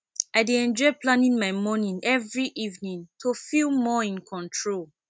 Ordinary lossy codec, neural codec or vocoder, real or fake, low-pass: none; none; real; none